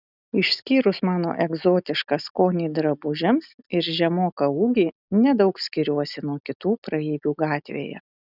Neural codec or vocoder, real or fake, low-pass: none; real; 5.4 kHz